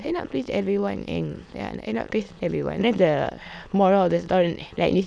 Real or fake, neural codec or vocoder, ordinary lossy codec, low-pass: fake; autoencoder, 22.05 kHz, a latent of 192 numbers a frame, VITS, trained on many speakers; none; none